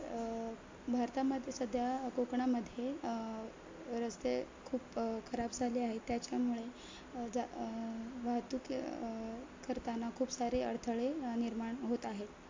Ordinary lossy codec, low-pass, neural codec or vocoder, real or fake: MP3, 48 kbps; 7.2 kHz; none; real